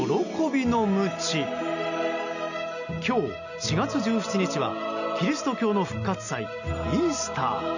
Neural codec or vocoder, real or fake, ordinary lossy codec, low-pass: none; real; none; 7.2 kHz